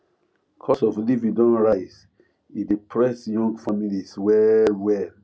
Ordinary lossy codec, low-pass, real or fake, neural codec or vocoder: none; none; real; none